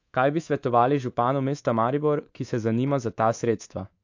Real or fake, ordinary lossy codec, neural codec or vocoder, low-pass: fake; AAC, 48 kbps; codec, 24 kHz, 3.1 kbps, DualCodec; 7.2 kHz